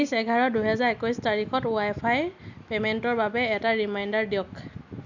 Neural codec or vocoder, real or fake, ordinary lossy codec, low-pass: none; real; none; 7.2 kHz